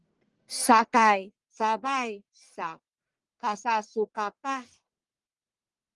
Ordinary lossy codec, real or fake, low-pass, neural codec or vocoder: Opus, 24 kbps; fake; 10.8 kHz; codec, 44.1 kHz, 1.7 kbps, Pupu-Codec